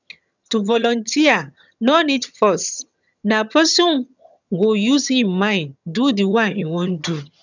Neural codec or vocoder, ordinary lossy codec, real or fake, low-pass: vocoder, 22.05 kHz, 80 mel bands, HiFi-GAN; none; fake; 7.2 kHz